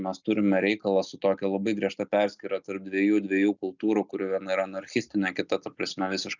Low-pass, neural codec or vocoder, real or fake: 7.2 kHz; none; real